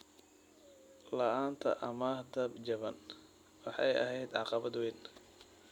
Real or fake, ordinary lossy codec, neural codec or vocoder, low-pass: real; none; none; 19.8 kHz